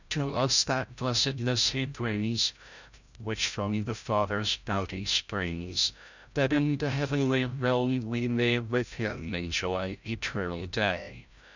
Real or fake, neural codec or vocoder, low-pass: fake; codec, 16 kHz, 0.5 kbps, FreqCodec, larger model; 7.2 kHz